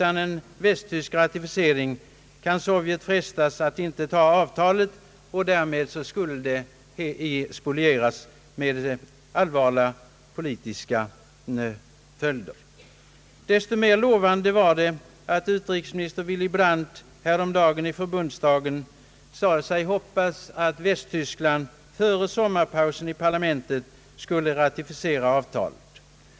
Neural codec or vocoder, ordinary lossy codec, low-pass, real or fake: none; none; none; real